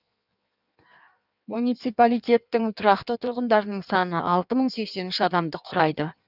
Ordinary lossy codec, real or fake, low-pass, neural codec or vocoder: none; fake; 5.4 kHz; codec, 16 kHz in and 24 kHz out, 1.1 kbps, FireRedTTS-2 codec